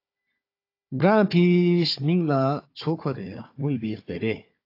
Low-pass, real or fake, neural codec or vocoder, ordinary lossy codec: 5.4 kHz; fake; codec, 16 kHz, 4 kbps, FunCodec, trained on Chinese and English, 50 frames a second; AAC, 32 kbps